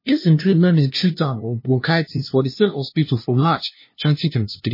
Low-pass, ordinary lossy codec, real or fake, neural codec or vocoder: 5.4 kHz; MP3, 24 kbps; fake; codec, 16 kHz, 0.5 kbps, FunCodec, trained on LibriTTS, 25 frames a second